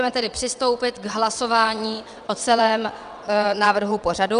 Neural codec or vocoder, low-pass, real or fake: vocoder, 22.05 kHz, 80 mel bands, WaveNeXt; 9.9 kHz; fake